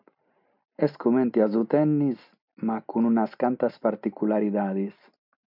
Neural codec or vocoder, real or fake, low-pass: none; real; 5.4 kHz